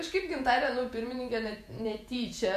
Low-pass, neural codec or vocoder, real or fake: 14.4 kHz; vocoder, 48 kHz, 128 mel bands, Vocos; fake